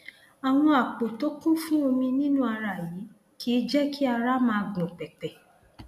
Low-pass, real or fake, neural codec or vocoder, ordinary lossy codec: 14.4 kHz; real; none; none